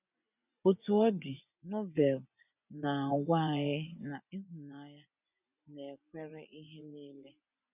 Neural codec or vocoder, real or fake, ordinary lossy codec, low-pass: vocoder, 24 kHz, 100 mel bands, Vocos; fake; none; 3.6 kHz